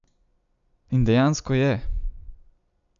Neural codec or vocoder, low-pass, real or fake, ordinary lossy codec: none; 7.2 kHz; real; none